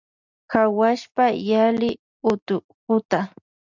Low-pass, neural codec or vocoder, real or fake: 7.2 kHz; none; real